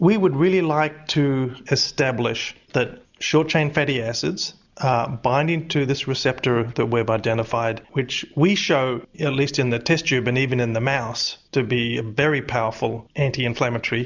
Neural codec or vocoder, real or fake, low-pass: none; real; 7.2 kHz